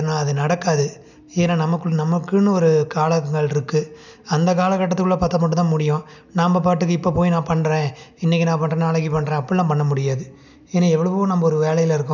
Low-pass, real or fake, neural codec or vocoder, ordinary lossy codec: 7.2 kHz; real; none; none